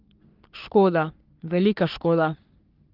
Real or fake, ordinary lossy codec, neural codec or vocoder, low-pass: fake; Opus, 32 kbps; codec, 44.1 kHz, 3.4 kbps, Pupu-Codec; 5.4 kHz